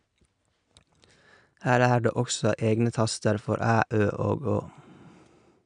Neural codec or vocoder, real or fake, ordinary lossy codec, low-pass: none; real; none; 9.9 kHz